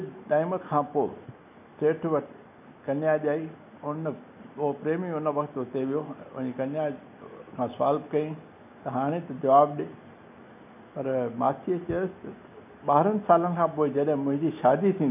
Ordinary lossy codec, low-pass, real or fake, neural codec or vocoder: none; 3.6 kHz; real; none